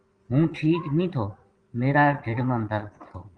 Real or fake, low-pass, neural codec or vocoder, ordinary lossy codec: fake; 9.9 kHz; vocoder, 22.05 kHz, 80 mel bands, Vocos; Opus, 24 kbps